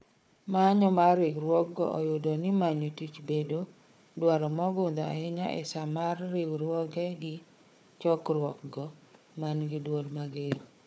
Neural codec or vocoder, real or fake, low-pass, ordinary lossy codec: codec, 16 kHz, 4 kbps, FunCodec, trained on Chinese and English, 50 frames a second; fake; none; none